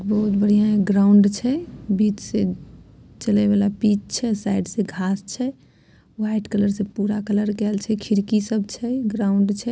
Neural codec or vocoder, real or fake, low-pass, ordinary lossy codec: none; real; none; none